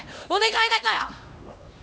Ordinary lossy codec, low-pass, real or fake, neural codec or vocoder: none; none; fake; codec, 16 kHz, 1 kbps, X-Codec, HuBERT features, trained on LibriSpeech